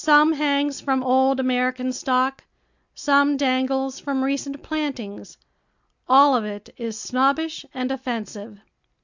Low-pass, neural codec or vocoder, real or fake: 7.2 kHz; none; real